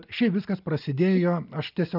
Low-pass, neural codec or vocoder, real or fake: 5.4 kHz; none; real